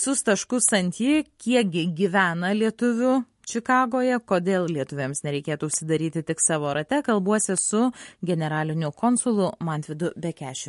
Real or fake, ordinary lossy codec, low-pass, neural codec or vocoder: real; MP3, 48 kbps; 14.4 kHz; none